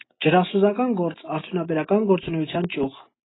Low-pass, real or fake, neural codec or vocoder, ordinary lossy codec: 7.2 kHz; real; none; AAC, 16 kbps